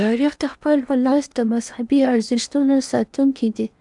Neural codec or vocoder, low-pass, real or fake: codec, 16 kHz in and 24 kHz out, 0.8 kbps, FocalCodec, streaming, 65536 codes; 10.8 kHz; fake